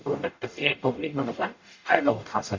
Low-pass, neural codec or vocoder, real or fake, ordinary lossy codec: 7.2 kHz; codec, 44.1 kHz, 0.9 kbps, DAC; fake; MP3, 48 kbps